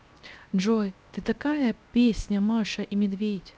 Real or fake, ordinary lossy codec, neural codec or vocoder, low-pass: fake; none; codec, 16 kHz, 0.7 kbps, FocalCodec; none